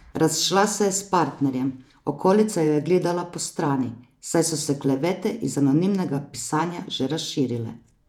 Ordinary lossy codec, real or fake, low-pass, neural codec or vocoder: none; fake; 19.8 kHz; vocoder, 48 kHz, 128 mel bands, Vocos